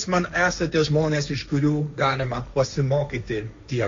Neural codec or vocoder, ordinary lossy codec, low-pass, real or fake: codec, 16 kHz, 1.1 kbps, Voila-Tokenizer; MP3, 48 kbps; 7.2 kHz; fake